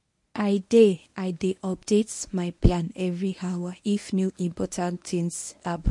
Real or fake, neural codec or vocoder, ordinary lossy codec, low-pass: fake; codec, 24 kHz, 0.9 kbps, WavTokenizer, medium speech release version 1; MP3, 48 kbps; 10.8 kHz